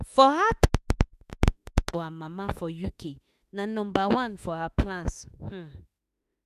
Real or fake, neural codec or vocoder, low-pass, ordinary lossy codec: fake; autoencoder, 48 kHz, 32 numbers a frame, DAC-VAE, trained on Japanese speech; 14.4 kHz; none